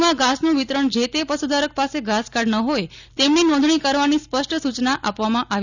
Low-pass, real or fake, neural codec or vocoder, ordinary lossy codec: 7.2 kHz; real; none; none